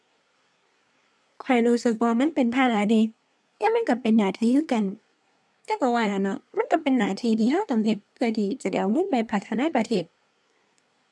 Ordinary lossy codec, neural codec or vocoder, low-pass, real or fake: none; codec, 24 kHz, 1 kbps, SNAC; none; fake